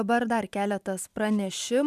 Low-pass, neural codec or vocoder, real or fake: 14.4 kHz; vocoder, 44.1 kHz, 128 mel bands every 512 samples, BigVGAN v2; fake